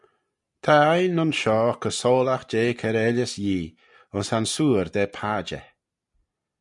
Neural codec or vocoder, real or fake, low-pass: none; real; 10.8 kHz